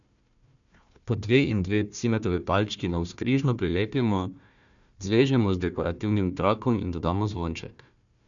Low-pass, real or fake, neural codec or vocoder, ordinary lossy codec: 7.2 kHz; fake; codec, 16 kHz, 1 kbps, FunCodec, trained on Chinese and English, 50 frames a second; none